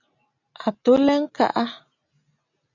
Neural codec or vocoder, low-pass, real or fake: none; 7.2 kHz; real